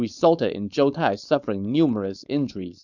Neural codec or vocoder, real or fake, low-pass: codec, 16 kHz, 4.8 kbps, FACodec; fake; 7.2 kHz